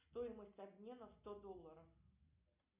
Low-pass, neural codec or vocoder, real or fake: 3.6 kHz; none; real